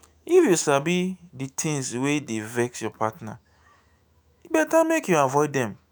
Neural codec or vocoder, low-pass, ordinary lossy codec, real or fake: autoencoder, 48 kHz, 128 numbers a frame, DAC-VAE, trained on Japanese speech; none; none; fake